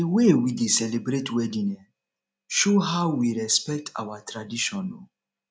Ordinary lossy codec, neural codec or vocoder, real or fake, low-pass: none; none; real; none